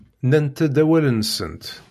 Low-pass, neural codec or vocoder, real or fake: 14.4 kHz; none; real